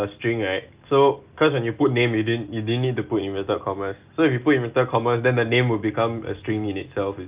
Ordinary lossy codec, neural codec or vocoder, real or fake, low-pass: Opus, 24 kbps; none; real; 3.6 kHz